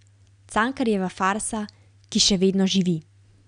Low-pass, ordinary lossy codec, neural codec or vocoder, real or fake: 9.9 kHz; none; none; real